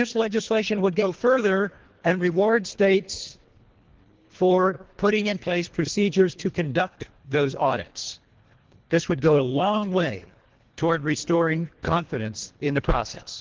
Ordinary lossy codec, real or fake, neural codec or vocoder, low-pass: Opus, 16 kbps; fake; codec, 24 kHz, 1.5 kbps, HILCodec; 7.2 kHz